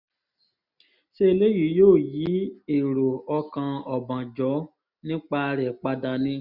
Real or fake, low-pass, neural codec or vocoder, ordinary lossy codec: real; 5.4 kHz; none; none